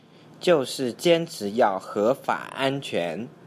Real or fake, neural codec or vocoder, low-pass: real; none; 14.4 kHz